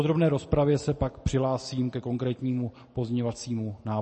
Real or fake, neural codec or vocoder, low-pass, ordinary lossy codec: real; none; 10.8 kHz; MP3, 32 kbps